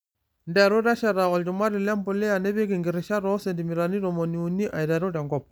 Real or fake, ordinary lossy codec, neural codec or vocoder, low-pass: real; none; none; none